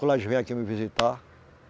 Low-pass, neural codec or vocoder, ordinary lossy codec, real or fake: none; none; none; real